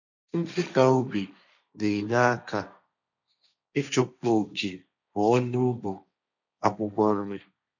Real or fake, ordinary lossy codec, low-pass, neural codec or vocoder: fake; none; 7.2 kHz; codec, 16 kHz, 1.1 kbps, Voila-Tokenizer